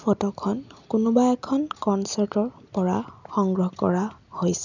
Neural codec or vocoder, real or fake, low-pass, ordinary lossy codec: none; real; 7.2 kHz; none